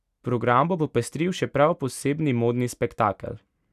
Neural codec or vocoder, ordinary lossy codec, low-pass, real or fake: none; none; 14.4 kHz; real